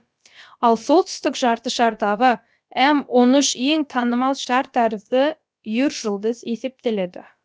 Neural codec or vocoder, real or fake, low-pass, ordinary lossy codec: codec, 16 kHz, about 1 kbps, DyCAST, with the encoder's durations; fake; none; none